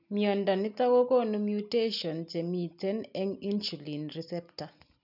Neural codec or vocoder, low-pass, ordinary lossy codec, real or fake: none; 5.4 kHz; none; real